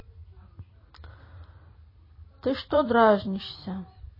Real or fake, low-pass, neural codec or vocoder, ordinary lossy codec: fake; 5.4 kHz; vocoder, 44.1 kHz, 128 mel bands every 256 samples, BigVGAN v2; MP3, 24 kbps